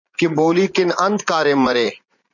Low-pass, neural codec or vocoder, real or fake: 7.2 kHz; vocoder, 44.1 kHz, 80 mel bands, Vocos; fake